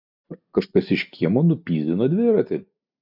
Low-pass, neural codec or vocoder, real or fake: 5.4 kHz; none; real